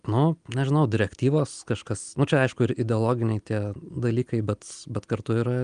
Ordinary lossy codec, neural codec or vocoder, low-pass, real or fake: Opus, 32 kbps; none; 9.9 kHz; real